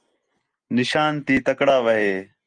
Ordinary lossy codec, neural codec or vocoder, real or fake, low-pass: Opus, 32 kbps; none; real; 9.9 kHz